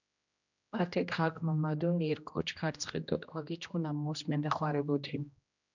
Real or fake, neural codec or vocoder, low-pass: fake; codec, 16 kHz, 1 kbps, X-Codec, HuBERT features, trained on general audio; 7.2 kHz